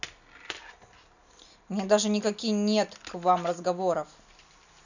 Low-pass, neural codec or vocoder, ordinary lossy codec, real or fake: 7.2 kHz; none; none; real